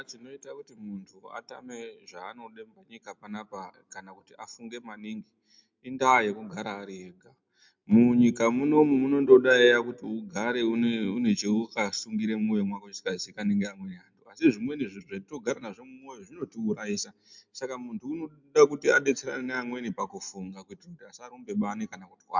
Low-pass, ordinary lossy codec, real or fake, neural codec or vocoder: 7.2 kHz; MP3, 64 kbps; real; none